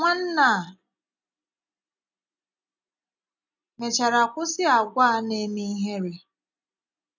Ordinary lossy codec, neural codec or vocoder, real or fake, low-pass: none; none; real; 7.2 kHz